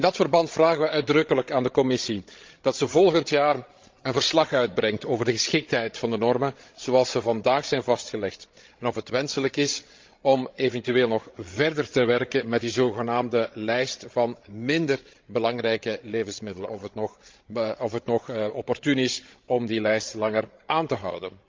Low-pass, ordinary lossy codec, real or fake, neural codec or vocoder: 7.2 kHz; Opus, 32 kbps; fake; codec, 16 kHz, 16 kbps, FreqCodec, larger model